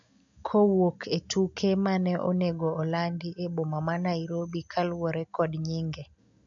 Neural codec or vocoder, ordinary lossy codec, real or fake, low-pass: none; AAC, 64 kbps; real; 7.2 kHz